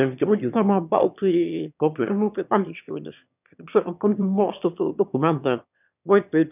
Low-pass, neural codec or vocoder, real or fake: 3.6 kHz; autoencoder, 22.05 kHz, a latent of 192 numbers a frame, VITS, trained on one speaker; fake